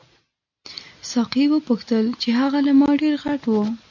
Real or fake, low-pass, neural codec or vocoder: real; 7.2 kHz; none